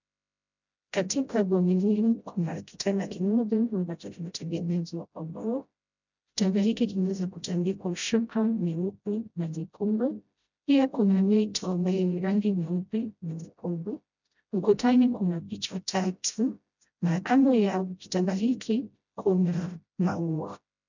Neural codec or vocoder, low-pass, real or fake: codec, 16 kHz, 0.5 kbps, FreqCodec, smaller model; 7.2 kHz; fake